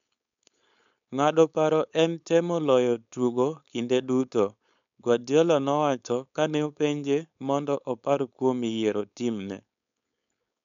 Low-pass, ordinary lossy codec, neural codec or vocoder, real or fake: 7.2 kHz; none; codec, 16 kHz, 4.8 kbps, FACodec; fake